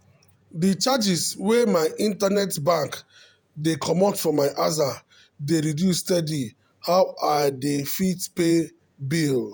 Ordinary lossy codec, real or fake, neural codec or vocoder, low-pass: none; fake; vocoder, 48 kHz, 128 mel bands, Vocos; none